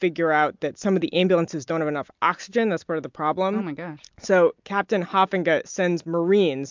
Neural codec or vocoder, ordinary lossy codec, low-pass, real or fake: none; MP3, 64 kbps; 7.2 kHz; real